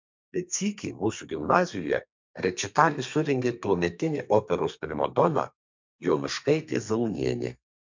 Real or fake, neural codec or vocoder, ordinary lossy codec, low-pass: fake; codec, 32 kHz, 1.9 kbps, SNAC; MP3, 64 kbps; 7.2 kHz